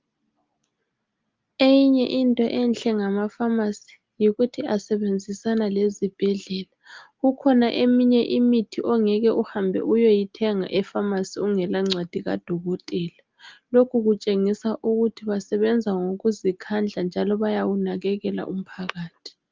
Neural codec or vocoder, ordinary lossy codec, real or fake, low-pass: none; Opus, 24 kbps; real; 7.2 kHz